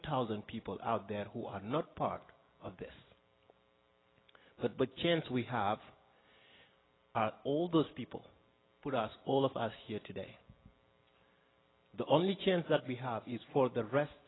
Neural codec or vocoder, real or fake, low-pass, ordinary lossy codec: none; real; 7.2 kHz; AAC, 16 kbps